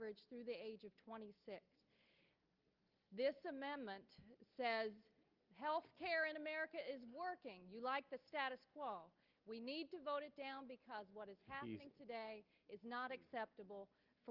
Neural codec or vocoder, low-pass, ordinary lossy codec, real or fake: none; 5.4 kHz; Opus, 24 kbps; real